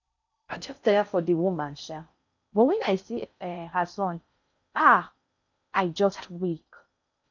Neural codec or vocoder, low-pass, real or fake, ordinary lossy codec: codec, 16 kHz in and 24 kHz out, 0.6 kbps, FocalCodec, streaming, 4096 codes; 7.2 kHz; fake; none